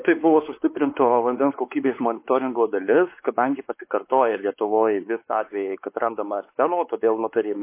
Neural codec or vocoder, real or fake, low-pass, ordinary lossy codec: codec, 16 kHz, 4 kbps, X-Codec, WavLM features, trained on Multilingual LibriSpeech; fake; 3.6 kHz; MP3, 24 kbps